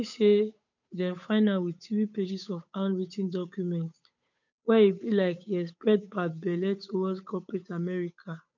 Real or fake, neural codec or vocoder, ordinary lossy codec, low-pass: fake; codec, 16 kHz, 8 kbps, FunCodec, trained on Chinese and English, 25 frames a second; none; 7.2 kHz